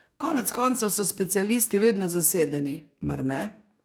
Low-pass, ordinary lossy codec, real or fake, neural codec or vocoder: none; none; fake; codec, 44.1 kHz, 2.6 kbps, DAC